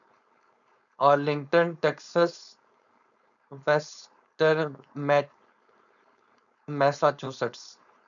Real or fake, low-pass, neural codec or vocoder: fake; 7.2 kHz; codec, 16 kHz, 4.8 kbps, FACodec